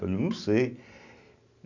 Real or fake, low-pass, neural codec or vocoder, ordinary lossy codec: real; 7.2 kHz; none; none